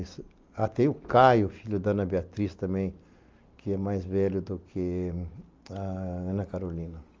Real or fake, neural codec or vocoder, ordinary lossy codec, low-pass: real; none; Opus, 32 kbps; 7.2 kHz